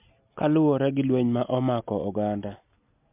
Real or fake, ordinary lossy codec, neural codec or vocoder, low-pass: real; MP3, 32 kbps; none; 3.6 kHz